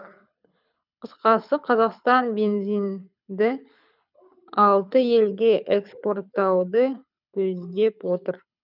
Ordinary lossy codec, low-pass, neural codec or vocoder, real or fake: none; 5.4 kHz; codec, 24 kHz, 6 kbps, HILCodec; fake